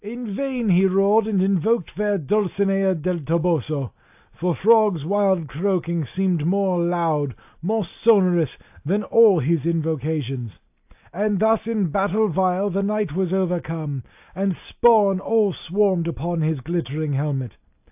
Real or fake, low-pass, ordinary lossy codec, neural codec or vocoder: real; 3.6 kHz; AAC, 32 kbps; none